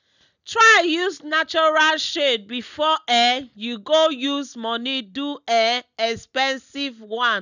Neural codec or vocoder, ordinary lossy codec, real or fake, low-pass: none; none; real; 7.2 kHz